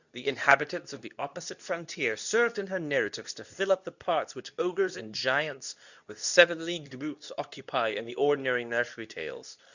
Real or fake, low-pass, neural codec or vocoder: fake; 7.2 kHz; codec, 24 kHz, 0.9 kbps, WavTokenizer, medium speech release version 2